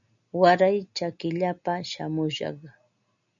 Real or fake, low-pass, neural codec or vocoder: real; 7.2 kHz; none